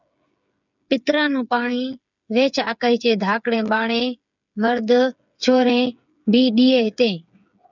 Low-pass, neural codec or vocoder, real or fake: 7.2 kHz; codec, 16 kHz, 8 kbps, FreqCodec, smaller model; fake